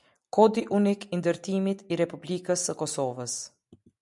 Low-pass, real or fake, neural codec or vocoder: 10.8 kHz; real; none